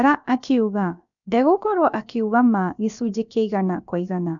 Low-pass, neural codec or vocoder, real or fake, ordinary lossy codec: 7.2 kHz; codec, 16 kHz, about 1 kbps, DyCAST, with the encoder's durations; fake; none